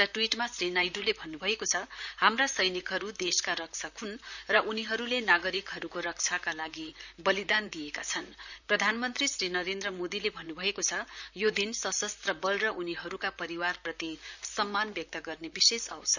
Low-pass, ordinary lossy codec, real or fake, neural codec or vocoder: 7.2 kHz; none; fake; vocoder, 44.1 kHz, 128 mel bands, Pupu-Vocoder